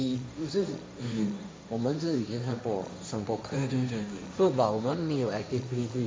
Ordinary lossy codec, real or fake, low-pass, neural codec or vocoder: none; fake; none; codec, 16 kHz, 1.1 kbps, Voila-Tokenizer